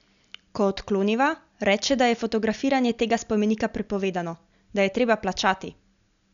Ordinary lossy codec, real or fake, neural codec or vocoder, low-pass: MP3, 96 kbps; real; none; 7.2 kHz